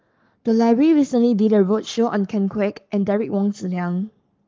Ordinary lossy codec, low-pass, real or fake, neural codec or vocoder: Opus, 32 kbps; 7.2 kHz; fake; codec, 44.1 kHz, 7.8 kbps, Pupu-Codec